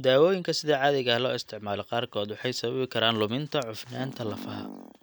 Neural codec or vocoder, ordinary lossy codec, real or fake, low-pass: none; none; real; none